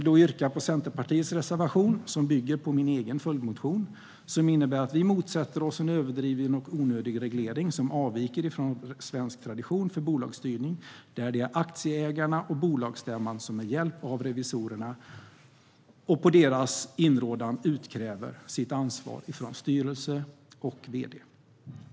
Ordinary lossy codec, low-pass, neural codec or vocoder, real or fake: none; none; none; real